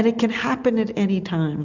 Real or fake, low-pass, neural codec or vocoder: real; 7.2 kHz; none